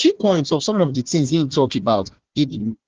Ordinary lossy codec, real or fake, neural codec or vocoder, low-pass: Opus, 16 kbps; fake; codec, 16 kHz, 1 kbps, FunCodec, trained on Chinese and English, 50 frames a second; 7.2 kHz